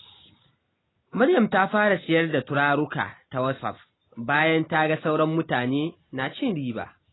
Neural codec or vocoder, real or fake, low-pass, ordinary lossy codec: none; real; 7.2 kHz; AAC, 16 kbps